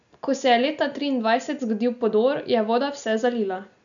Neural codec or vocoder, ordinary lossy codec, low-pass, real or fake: none; none; 7.2 kHz; real